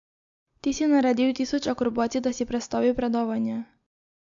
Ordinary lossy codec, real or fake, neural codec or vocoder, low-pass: AAC, 64 kbps; real; none; 7.2 kHz